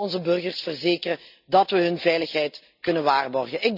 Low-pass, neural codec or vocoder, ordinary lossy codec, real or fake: 5.4 kHz; none; none; real